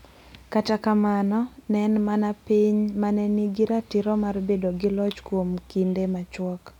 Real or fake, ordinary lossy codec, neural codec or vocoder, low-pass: real; none; none; 19.8 kHz